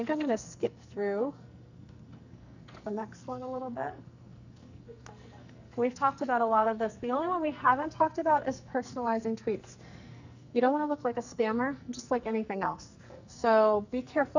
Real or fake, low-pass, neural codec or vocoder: fake; 7.2 kHz; codec, 44.1 kHz, 2.6 kbps, SNAC